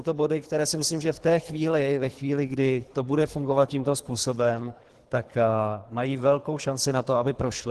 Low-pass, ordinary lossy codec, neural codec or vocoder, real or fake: 10.8 kHz; Opus, 16 kbps; codec, 24 kHz, 3 kbps, HILCodec; fake